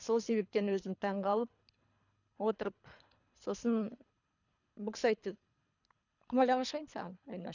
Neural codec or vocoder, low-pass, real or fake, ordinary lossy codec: codec, 24 kHz, 3 kbps, HILCodec; 7.2 kHz; fake; none